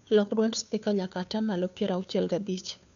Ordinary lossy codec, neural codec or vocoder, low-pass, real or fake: none; codec, 16 kHz, 2 kbps, FunCodec, trained on Chinese and English, 25 frames a second; 7.2 kHz; fake